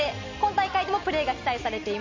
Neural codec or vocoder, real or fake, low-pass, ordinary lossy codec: none; real; 7.2 kHz; MP3, 48 kbps